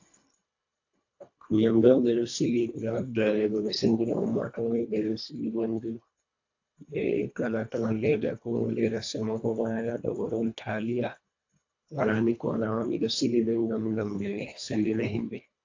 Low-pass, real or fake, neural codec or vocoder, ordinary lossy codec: 7.2 kHz; fake; codec, 24 kHz, 1.5 kbps, HILCodec; AAC, 48 kbps